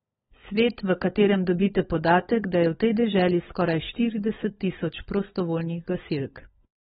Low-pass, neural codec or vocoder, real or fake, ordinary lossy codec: 7.2 kHz; codec, 16 kHz, 16 kbps, FunCodec, trained on LibriTTS, 50 frames a second; fake; AAC, 16 kbps